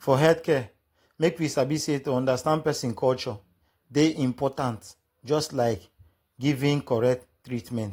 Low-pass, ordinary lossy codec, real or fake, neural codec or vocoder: 19.8 kHz; AAC, 48 kbps; real; none